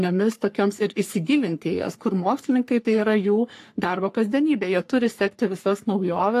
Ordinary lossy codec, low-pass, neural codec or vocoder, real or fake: AAC, 64 kbps; 14.4 kHz; codec, 44.1 kHz, 3.4 kbps, Pupu-Codec; fake